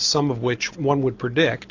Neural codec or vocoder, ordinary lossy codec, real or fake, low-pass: none; AAC, 48 kbps; real; 7.2 kHz